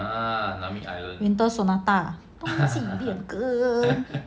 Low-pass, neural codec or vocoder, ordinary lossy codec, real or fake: none; none; none; real